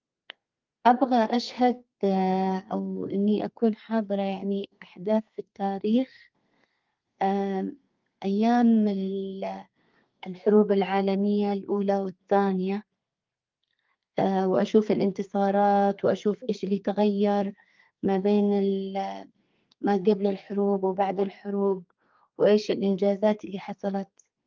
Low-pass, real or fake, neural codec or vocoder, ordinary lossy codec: 7.2 kHz; fake; codec, 32 kHz, 1.9 kbps, SNAC; Opus, 32 kbps